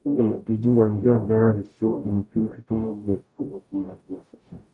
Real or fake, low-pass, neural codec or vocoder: fake; 10.8 kHz; codec, 44.1 kHz, 0.9 kbps, DAC